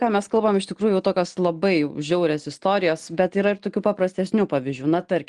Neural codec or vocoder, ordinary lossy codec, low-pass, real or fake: none; Opus, 24 kbps; 9.9 kHz; real